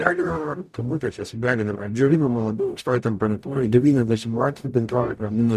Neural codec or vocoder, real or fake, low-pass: codec, 44.1 kHz, 0.9 kbps, DAC; fake; 14.4 kHz